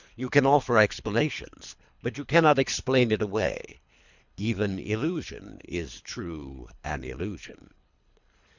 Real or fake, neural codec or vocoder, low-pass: fake; codec, 24 kHz, 3 kbps, HILCodec; 7.2 kHz